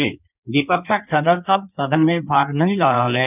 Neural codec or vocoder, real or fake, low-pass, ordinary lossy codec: codec, 16 kHz in and 24 kHz out, 1.1 kbps, FireRedTTS-2 codec; fake; 3.6 kHz; none